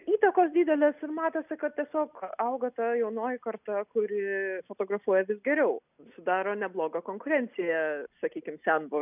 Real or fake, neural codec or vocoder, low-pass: real; none; 3.6 kHz